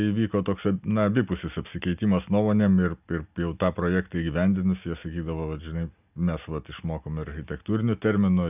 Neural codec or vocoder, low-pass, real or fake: none; 3.6 kHz; real